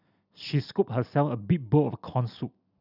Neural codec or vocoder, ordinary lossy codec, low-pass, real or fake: none; none; 5.4 kHz; real